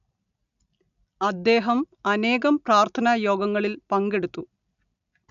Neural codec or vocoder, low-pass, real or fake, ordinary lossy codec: none; 7.2 kHz; real; none